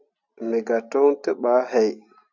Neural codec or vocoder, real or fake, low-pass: none; real; 7.2 kHz